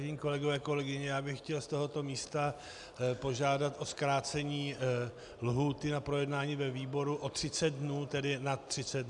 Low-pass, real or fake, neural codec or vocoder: 10.8 kHz; real; none